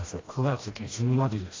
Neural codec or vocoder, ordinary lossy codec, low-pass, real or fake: codec, 16 kHz, 1 kbps, FreqCodec, smaller model; AAC, 32 kbps; 7.2 kHz; fake